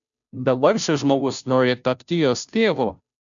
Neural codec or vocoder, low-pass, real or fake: codec, 16 kHz, 0.5 kbps, FunCodec, trained on Chinese and English, 25 frames a second; 7.2 kHz; fake